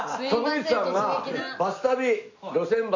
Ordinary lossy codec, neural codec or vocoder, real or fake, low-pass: none; none; real; 7.2 kHz